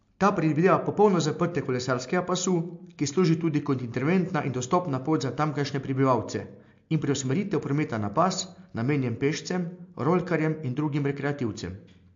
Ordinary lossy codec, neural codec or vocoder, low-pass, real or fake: MP3, 48 kbps; none; 7.2 kHz; real